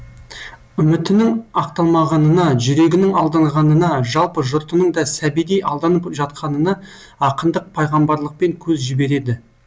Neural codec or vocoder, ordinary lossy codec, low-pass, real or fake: none; none; none; real